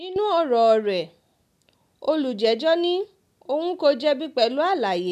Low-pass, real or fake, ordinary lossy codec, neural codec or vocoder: 14.4 kHz; real; none; none